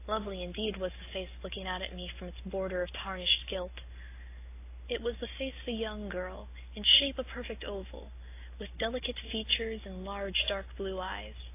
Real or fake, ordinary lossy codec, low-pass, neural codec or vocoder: real; AAC, 24 kbps; 3.6 kHz; none